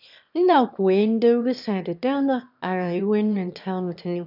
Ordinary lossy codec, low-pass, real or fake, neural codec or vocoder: none; 5.4 kHz; fake; autoencoder, 22.05 kHz, a latent of 192 numbers a frame, VITS, trained on one speaker